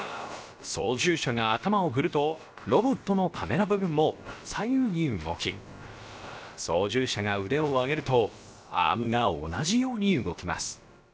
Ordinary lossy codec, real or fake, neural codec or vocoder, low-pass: none; fake; codec, 16 kHz, about 1 kbps, DyCAST, with the encoder's durations; none